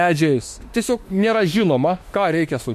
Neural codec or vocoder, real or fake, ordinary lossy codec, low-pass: autoencoder, 48 kHz, 32 numbers a frame, DAC-VAE, trained on Japanese speech; fake; MP3, 64 kbps; 14.4 kHz